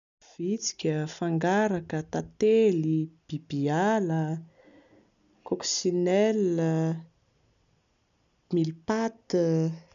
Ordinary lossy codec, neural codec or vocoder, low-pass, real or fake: none; none; 7.2 kHz; real